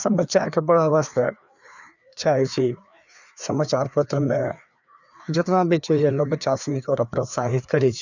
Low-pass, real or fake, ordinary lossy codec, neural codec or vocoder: 7.2 kHz; fake; none; codec, 16 kHz, 2 kbps, FreqCodec, larger model